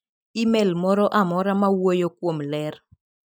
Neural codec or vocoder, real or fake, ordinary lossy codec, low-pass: none; real; none; none